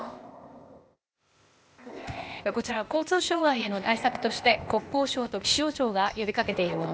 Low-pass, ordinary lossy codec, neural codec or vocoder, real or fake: none; none; codec, 16 kHz, 0.8 kbps, ZipCodec; fake